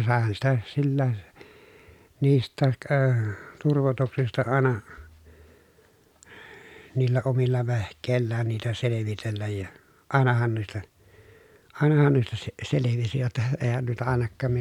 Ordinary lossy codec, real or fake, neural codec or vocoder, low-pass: none; real; none; 19.8 kHz